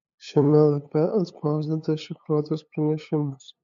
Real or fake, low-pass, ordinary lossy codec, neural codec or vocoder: fake; 7.2 kHz; MP3, 48 kbps; codec, 16 kHz, 8 kbps, FunCodec, trained on LibriTTS, 25 frames a second